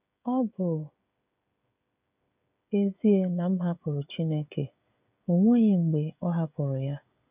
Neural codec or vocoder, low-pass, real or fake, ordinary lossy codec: codec, 16 kHz, 16 kbps, FreqCodec, smaller model; 3.6 kHz; fake; none